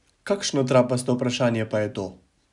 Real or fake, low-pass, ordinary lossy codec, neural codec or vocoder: real; 10.8 kHz; none; none